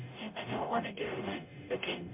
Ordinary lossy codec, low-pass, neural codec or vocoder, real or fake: MP3, 32 kbps; 3.6 kHz; codec, 44.1 kHz, 0.9 kbps, DAC; fake